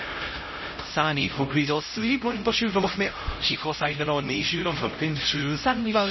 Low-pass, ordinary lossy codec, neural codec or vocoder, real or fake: 7.2 kHz; MP3, 24 kbps; codec, 16 kHz, 0.5 kbps, X-Codec, HuBERT features, trained on LibriSpeech; fake